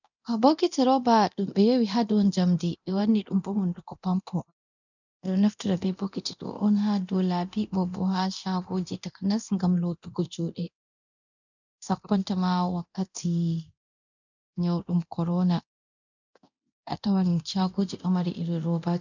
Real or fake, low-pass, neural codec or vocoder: fake; 7.2 kHz; codec, 24 kHz, 0.9 kbps, DualCodec